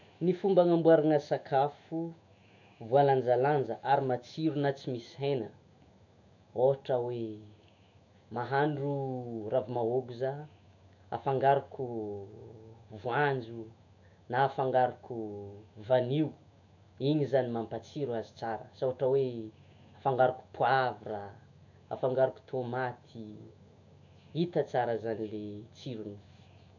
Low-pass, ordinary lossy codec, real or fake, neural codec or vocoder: 7.2 kHz; none; fake; autoencoder, 48 kHz, 128 numbers a frame, DAC-VAE, trained on Japanese speech